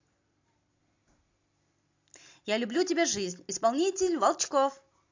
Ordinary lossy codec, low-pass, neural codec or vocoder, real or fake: AAC, 48 kbps; 7.2 kHz; none; real